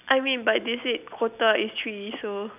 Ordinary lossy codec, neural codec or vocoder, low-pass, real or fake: none; none; 3.6 kHz; real